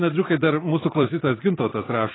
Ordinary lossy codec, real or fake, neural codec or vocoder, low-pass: AAC, 16 kbps; fake; vocoder, 44.1 kHz, 128 mel bands every 256 samples, BigVGAN v2; 7.2 kHz